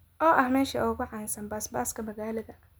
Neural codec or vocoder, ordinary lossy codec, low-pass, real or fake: none; none; none; real